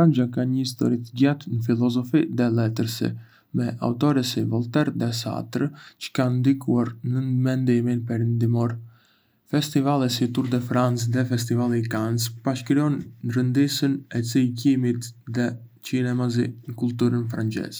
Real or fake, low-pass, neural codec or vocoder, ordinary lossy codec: real; none; none; none